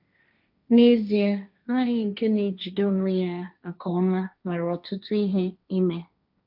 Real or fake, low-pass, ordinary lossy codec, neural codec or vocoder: fake; 5.4 kHz; none; codec, 16 kHz, 1.1 kbps, Voila-Tokenizer